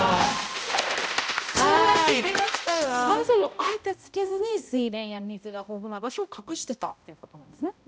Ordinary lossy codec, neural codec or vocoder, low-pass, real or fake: none; codec, 16 kHz, 0.5 kbps, X-Codec, HuBERT features, trained on balanced general audio; none; fake